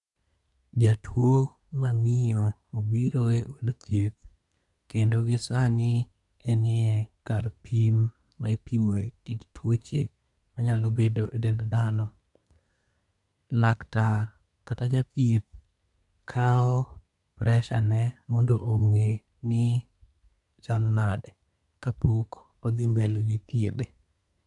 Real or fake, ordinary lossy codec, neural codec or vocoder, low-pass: fake; none; codec, 24 kHz, 1 kbps, SNAC; 10.8 kHz